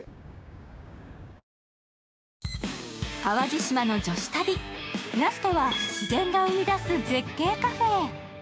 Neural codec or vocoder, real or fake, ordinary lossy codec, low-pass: codec, 16 kHz, 6 kbps, DAC; fake; none; none